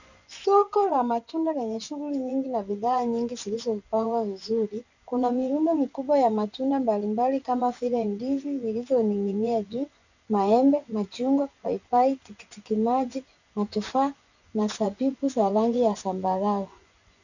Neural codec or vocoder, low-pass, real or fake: vocoder, 24 kHz, 100 mel bands, Vocos; 7.2 kHz; fake